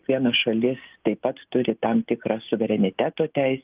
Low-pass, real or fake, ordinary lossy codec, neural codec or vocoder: 3.6 kHz; real; Opus, 24 kbps; none